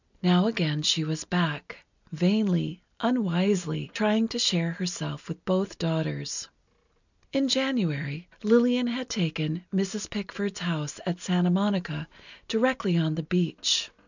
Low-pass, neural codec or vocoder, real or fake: 7.2 kHz; none; real